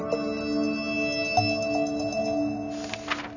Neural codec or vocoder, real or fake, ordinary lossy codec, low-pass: none; real; none; 7.2 kHz